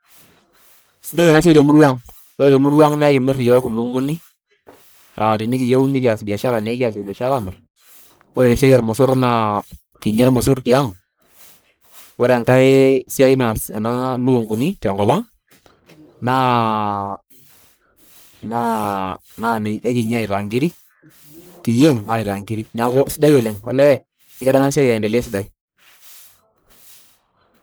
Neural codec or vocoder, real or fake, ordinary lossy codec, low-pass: codec, 44.1 kHz, 1.7 kbps, Pupu-Codec; fake; none; none